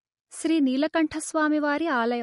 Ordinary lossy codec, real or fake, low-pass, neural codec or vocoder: MP3, 48 kbps; real; 14.4 kHz; none